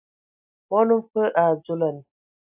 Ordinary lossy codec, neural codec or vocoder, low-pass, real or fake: AAC, 32 kbps; none; 3.6 kHz; real